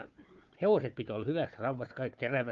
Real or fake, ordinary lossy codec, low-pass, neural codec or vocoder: fake; Opus, 32 kbps; 7.2 kHz; codec, 16 kHz, 4 kbps, FunCodec, trained on Chinese and English, 50 frames a second